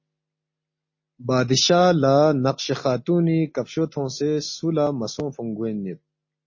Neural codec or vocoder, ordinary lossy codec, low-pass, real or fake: none; MP3, 32 kbps; 7.2 kHz; real